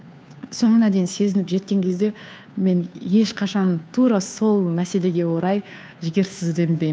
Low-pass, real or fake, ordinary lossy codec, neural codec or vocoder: none; fake; none; codec, 16 kHz, 2 kbps, FunCodec, trained on Chinese and English, 25 frames a second